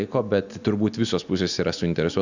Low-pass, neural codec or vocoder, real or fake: 7.2 kHz; none; real